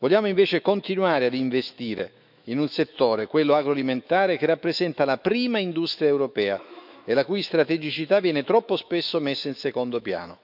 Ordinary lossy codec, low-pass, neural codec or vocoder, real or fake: none; 5.4 kHz; autoencoder, 48 kHz, 128 numbers a frame, DAC-VAE, trained on Japanese speech; fake